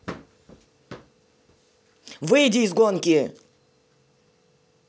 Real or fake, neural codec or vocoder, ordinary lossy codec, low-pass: real; none; none; none